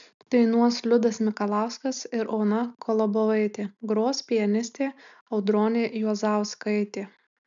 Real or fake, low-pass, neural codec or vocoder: real; 7.2 kHz; none